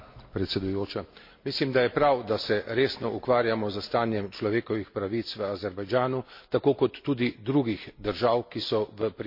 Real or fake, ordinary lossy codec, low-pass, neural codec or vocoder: real; MP3, 32 kbps; 5.4 kHz; none